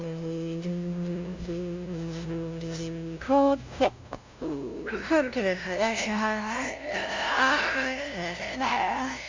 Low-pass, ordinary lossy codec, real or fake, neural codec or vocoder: 7.2 kHz; MP3, 64 kbps; fake; codec, 16 kHz, 0.5 kbps, FunCodec, trained on LibriTTS, 25 frames a second